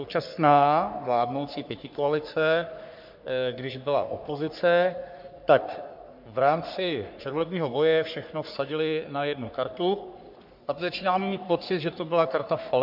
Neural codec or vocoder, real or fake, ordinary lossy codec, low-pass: codec, 44.1 kHz, 3.4 kbps, Pupu-Codec; fake; MP3, 48 kbps; 5.4 kHz